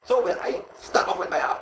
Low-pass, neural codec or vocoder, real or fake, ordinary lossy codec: none; codec, 16 kHz, 4.8 kbps, FACodec; fake; none